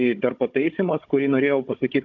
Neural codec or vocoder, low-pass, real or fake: codec, 16 kHz, 16 kbps, FunCodec, trained on Chinese and English, 50 frames a second; 7.2 kHz; fake